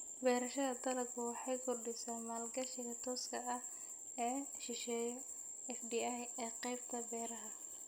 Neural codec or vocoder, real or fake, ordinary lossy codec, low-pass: none; real; none; none